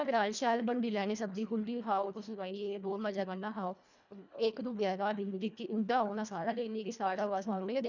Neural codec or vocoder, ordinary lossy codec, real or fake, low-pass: codec, 24 kHz, 1.5 kbps, HILCodec; none; fake; 7.2 kHz